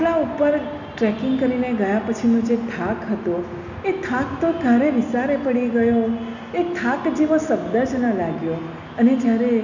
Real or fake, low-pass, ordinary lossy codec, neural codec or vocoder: real; 7.2 kHz; none; none